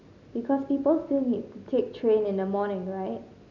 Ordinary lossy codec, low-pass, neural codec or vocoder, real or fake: none; 7.2 kHz; none; real